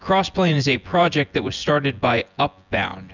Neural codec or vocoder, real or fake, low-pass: vocoder, 24 kHz, 100 mel bands, Vocos; fake; 7.2 kHz